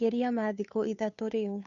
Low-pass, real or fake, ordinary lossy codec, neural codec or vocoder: 7.2 kHz; fake; none; codec, 16 kHz, 2 kbps, FunCodec, trained on Chinese and English, 25 frames a second